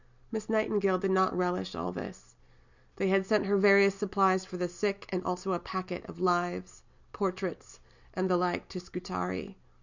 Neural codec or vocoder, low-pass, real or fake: none; 7.2 kHz; real